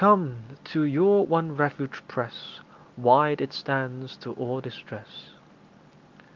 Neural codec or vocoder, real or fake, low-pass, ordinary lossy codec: none; real; 7.2 kHz; Opus, 32 kbps